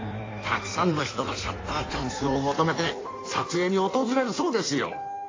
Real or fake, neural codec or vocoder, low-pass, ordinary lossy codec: fake; codec, 16 kHz in and 24 kHz out, 1.1 kbps, FireRedTTS-2 codec; 7.2 kHz; AAC, 32 kbps